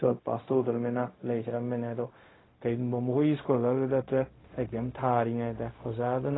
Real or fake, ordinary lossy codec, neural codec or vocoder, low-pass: fake; AAC, 16 kbps; codec, 16 kHz, 0.4 kbps, LongCat-Audio-Codec; 7.2 kHz